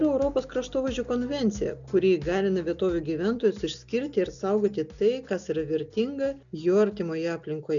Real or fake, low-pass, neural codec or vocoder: real; 7.2 kHz; none